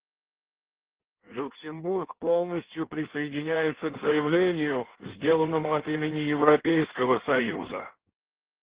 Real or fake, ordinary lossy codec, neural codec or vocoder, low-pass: fake; Opus, 16 kbps; codec, 16 kHz in and 24 kHz out, 1.1 kbps, FireRedTTS-2 codec; 3.6 kHz